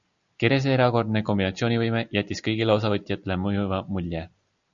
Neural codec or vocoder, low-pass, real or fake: none; 7.2 kHz; real